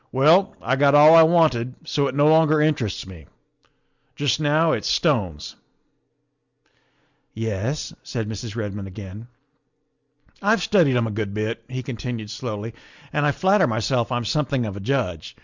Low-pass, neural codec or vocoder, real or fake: 7.2 kHz; none; real